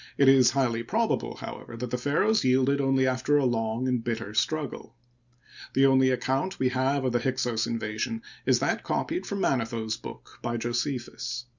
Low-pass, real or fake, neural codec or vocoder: 7.2 kHz; real; none